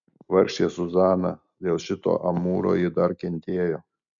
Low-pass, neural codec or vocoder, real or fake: 7.2 kHz; none; real